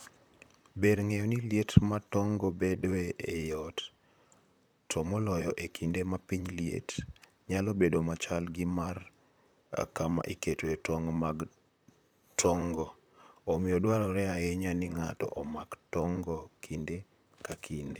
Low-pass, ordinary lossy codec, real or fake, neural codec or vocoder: none; none; fake; vocoder, 44.1 kHz, 128 mel bands, Pupu-Vocoder